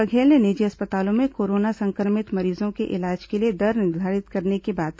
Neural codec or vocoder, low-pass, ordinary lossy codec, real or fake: none; none; none; real